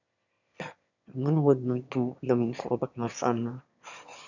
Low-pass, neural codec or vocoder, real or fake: 7.2 kHz; autoencoder, 22.05 kHz, a latent of 192 numbers a frame, VITS, trained on one speaker; fake